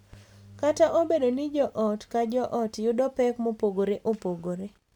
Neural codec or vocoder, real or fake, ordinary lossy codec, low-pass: none; real; none; 19.8 kHz